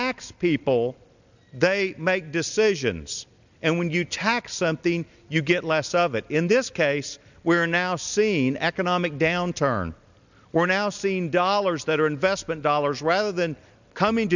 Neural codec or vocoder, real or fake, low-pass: none; real; 7.2 kHz